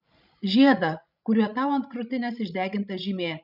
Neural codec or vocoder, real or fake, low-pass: codec, 16 kHz, 16 kbps, FreqCodec, larger model; fake; 5.4 kHz